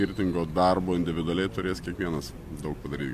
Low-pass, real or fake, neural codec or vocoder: 14.4 kHz; real; none